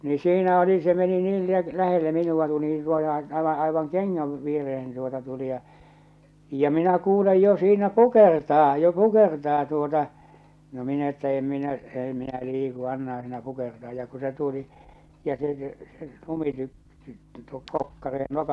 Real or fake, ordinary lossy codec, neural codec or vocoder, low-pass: real; none; none; none